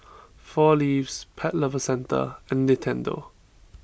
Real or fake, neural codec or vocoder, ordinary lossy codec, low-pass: real; none; none; none